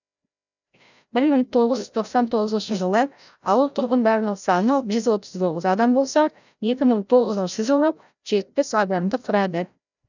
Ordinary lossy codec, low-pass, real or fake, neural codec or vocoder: none; 7.2 kHz; fake; codec, 16 kHz, 0.5 kbps, FreqCodec, larger model